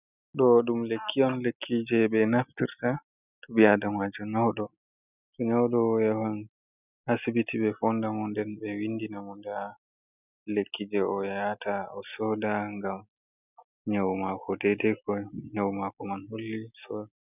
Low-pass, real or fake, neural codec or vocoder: 3.6 kHz; real; none